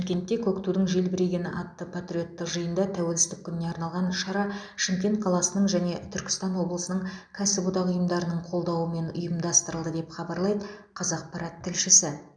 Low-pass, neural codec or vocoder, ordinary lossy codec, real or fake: 9.9 kHz; none; none; real